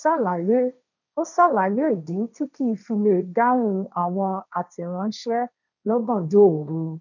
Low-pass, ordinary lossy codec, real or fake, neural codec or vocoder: 7.2 kHz; none; fake; codec, 16 kHz, 1.1 kbps, Voila-Tokenizer